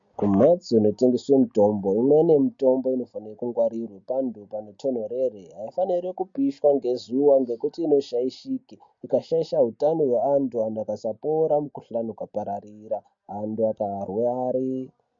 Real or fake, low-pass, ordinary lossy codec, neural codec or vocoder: real; 7.2 kHz; MP3, 48 kbps; none